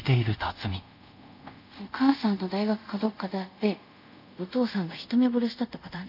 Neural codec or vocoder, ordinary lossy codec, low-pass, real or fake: codec, 24 kHz, 0.5 kbps, DualCodec; none; 5.4 kHz; fake